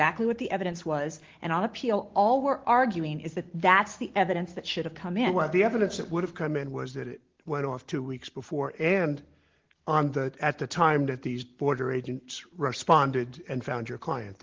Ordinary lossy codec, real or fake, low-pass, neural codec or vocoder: Opus, 16 kbps; real; 7.2 kHz; none